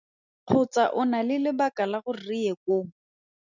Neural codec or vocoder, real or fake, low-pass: none; real; 7.2 kHz